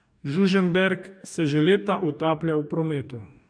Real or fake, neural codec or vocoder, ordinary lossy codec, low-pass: fake; codec, 44.1 kHz, 2.6 kbps, DAC; none; 9.9 kHz